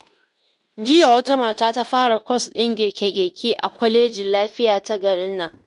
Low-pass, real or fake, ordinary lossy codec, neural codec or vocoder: 10.8 kHz; fake; none; codec, 16 kHz in and 24 kHz out, 0.9 kbps, LongCat-Audio-Codec, fine tuned four codebook decoder